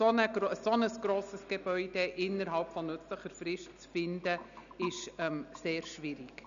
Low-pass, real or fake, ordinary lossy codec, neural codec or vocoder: 7.2 kHz; real; none; none